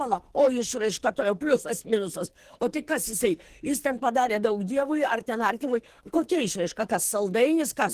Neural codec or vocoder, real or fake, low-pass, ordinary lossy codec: codec, 44.1 kHz, 2.6 kbps, SNAC; fake; 14.4 kHz; Opus, 16 kbps